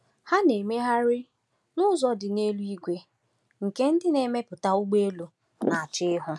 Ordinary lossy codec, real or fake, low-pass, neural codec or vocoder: none; real; none; none